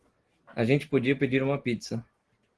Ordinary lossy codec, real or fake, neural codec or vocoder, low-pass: Opus, 16 kbps; real; none; 10.8 kHz